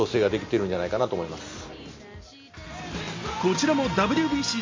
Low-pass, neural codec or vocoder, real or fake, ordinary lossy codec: 7.2 kHz; none; real; MP3, 32 kbps